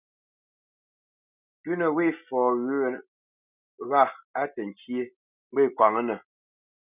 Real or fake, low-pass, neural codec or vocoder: real; 3.6 kHz; none